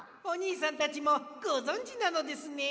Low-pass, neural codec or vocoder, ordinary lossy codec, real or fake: none; none; none; real